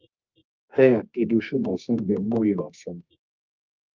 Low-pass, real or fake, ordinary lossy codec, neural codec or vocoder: 7.2 kHz; fake; Opus, 24 kbps; codec, 24 kHz, 0.9 kbps, WavTokenizer, medium music audio release